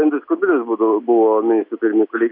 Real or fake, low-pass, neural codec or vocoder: real; 5.4 kHz; none